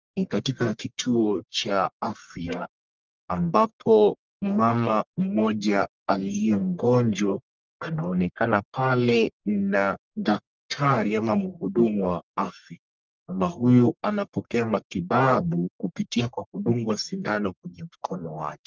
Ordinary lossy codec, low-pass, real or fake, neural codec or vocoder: Opus, 24 kbps; 7.2 kHz; fake; codec, 44.1 kHz, 1.7 kbps, Pupu-Codec